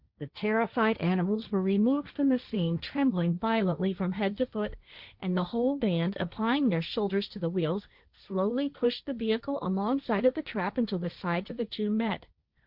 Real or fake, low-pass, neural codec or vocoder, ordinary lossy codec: fake; 5.4 kHz; codec, 16 kHz, 1.1 kbps, Voila-Tokenizer; Opus, 64 kbps